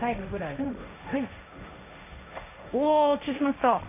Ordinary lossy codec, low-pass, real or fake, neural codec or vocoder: none; 3.6 kHz; fake; codec, 16 kHz, 1.1 kbps, Voila-Tokenizer